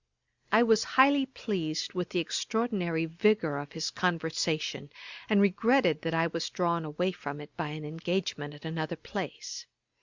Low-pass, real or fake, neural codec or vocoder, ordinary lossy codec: 7.2 kHz; real; none; Opus, 64 kbps